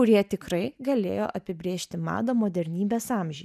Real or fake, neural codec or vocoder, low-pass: real; none; 14.4 kHz